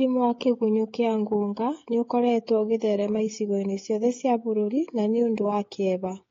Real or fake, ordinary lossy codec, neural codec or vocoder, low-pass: fake; AAC, 32 kbps; codec, 16 kHz, 16 kbps, FreqCodec, smaller model; 7.2 kHz